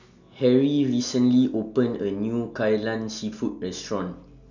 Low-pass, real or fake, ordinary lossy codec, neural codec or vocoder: 7.2 kHz; real; none; none